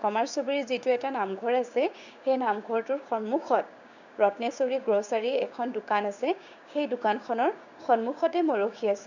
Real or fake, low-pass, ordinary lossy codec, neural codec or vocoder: fake; 7.2 kHz; none; codec, 16 kHz, 6 kbps, DAC